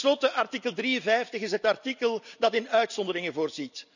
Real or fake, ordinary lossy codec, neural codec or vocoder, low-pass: real; none; none; 7.2 kHz